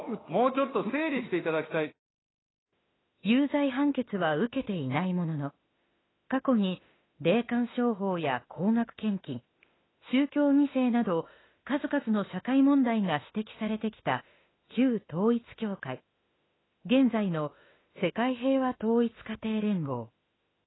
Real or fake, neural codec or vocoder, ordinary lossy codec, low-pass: fake; autoencoder, 48 kHz, 32 numbers a frame, DAC-VAE, trained on Japanese speech; AAC, 16 kbps; 7.2 kHz